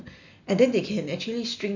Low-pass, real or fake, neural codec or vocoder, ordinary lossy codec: 7.2 kHz; real; none; none